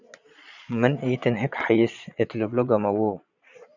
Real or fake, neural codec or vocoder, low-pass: fake; vocoder, 22.05 kHz, 80 mel bands, Vocos; 7.2 kHz